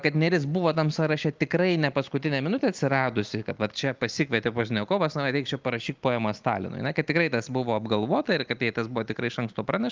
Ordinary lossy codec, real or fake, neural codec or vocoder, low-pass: Opus, 32 kbps; real; none; 7.2 kHz